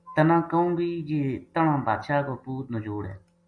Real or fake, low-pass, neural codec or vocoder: real; 9.9 kHz; none